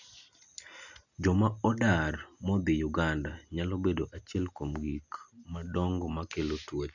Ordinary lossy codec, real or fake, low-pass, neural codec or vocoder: none; real; 7.2 kHz; none